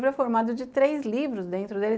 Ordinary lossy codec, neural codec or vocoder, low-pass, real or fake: none; none; none; real